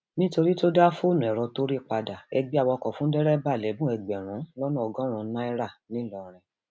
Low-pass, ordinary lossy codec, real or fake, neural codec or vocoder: none; none; real; none